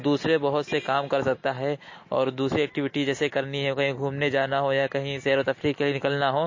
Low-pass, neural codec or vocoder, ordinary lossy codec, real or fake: 7.2 kHz; none; MP3, 32 kbps; real